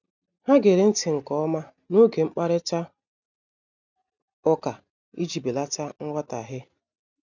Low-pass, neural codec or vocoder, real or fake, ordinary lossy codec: 7.2 kHz; none; real; none